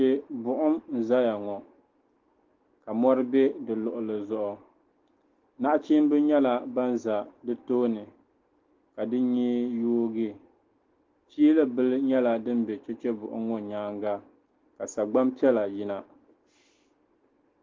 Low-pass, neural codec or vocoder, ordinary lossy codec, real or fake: 7.2 kHz; none; Opus, 16 kbps; real